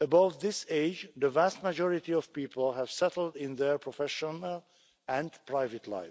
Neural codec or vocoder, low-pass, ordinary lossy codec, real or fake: none; none; none; real